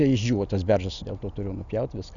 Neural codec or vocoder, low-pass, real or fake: none; 7.2 kHz; real